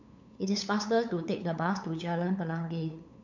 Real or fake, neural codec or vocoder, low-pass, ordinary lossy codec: fake; codec, 16 kHz, 8 kbps, FunCodec, trained on LibriTTS, 25 frames a second; 7.2 kHz; none